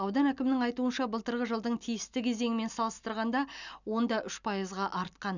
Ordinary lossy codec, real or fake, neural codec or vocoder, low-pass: none; real; none; 7.2 kHz